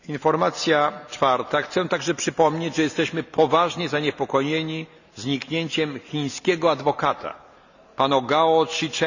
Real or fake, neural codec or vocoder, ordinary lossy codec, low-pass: real; none; none; 7.2 kHz